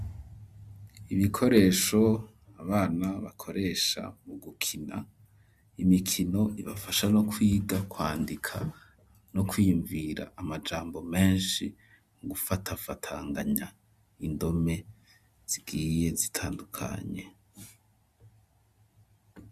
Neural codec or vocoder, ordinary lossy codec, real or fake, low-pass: none; Opus, 64 kbps; real; 14.4 kHz